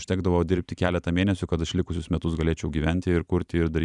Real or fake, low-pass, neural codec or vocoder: fake; 10.8 kHz; vocoder, 44.1 kHz, 128 mel bands every 256 samples, BigVGAN v2